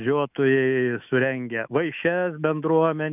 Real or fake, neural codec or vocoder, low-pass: fake; autoencoder, 48 kHz, 128 numbers a frame, DAC-VAE, trained on Japanese speech; 3.6 kHz